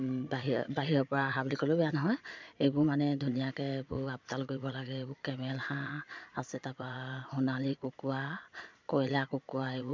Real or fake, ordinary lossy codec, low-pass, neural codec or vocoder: fake; MP3, 64 kbps; 7.2 kHz; vocoder, 22.05 kHz, 80 mel bands, Vocos